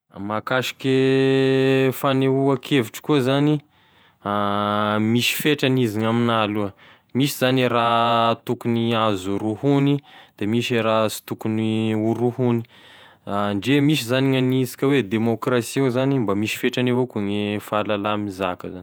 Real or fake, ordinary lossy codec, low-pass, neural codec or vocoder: fake; none; none; vocoder, 48 kHz, 128 mel bands, Vocos